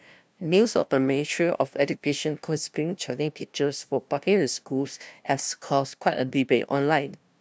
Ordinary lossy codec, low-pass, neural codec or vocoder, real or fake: none; none; codec, 16 kHz, 0.5 kbps, FunCodec, trained on LibriTTS, 25 frames a second; fake